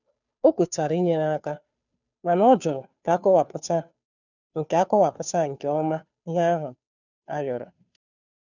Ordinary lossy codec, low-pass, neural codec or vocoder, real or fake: none; 7.2 kHz; codec, 16 kHz, 2 kbps, FunCodec, trained on Chinese and English, 25 frames a second; fake